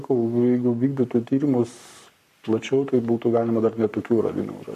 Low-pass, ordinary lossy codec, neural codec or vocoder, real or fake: 14.4 kHz; MP3, 64 kbps; codec, 44.1 kHz, 7.8 kbps, Pupu-Codec; fake